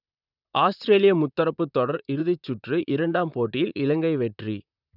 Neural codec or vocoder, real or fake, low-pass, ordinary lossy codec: vocoder, 44.1 kHz, 128 mel bands, Pupu-Vocoder; fake; 5.4 kHz; none